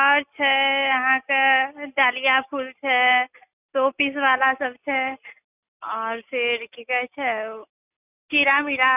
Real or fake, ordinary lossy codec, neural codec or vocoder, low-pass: real; none; none; 3.6 kHz